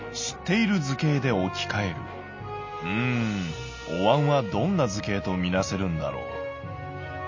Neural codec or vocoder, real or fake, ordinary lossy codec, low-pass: none; real; MP3, 32 kbps; 7.2 kHz